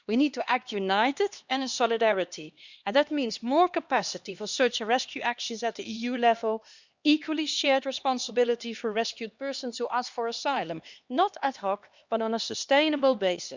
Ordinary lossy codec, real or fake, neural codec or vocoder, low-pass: Opus, 64 kbps; fake; codec, 16 kHz, 2 kbps, X-Codec, HuBERT features, trained on LibriSpeech; 7.2 kHz